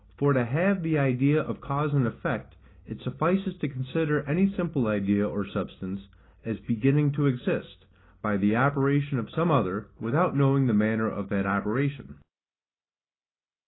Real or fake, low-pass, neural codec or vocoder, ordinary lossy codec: real; 7.2 kHz; none; AAC, 16 kbps